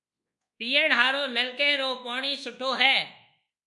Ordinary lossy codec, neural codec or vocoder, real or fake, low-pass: MP3, 96 kbps; codec, 24 kHz, 1.2 kbps, DualCodec; fake; 10.8 kHz